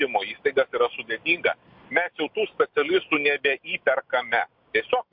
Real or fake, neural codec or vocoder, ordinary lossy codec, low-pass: real; none; MP3, 48 kbps; 5.4 kHz